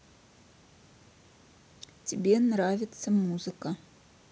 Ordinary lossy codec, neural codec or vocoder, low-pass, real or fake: none; none; none; real